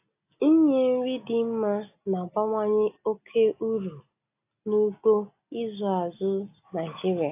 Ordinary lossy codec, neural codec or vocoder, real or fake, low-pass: AAC, 24 kbps; none; real; 3.6 kHz